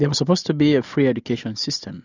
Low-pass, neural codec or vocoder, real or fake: 7.2 kHz; none; real